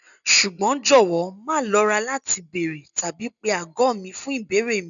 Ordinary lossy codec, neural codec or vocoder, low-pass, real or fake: none; none; 7.2 kHz; real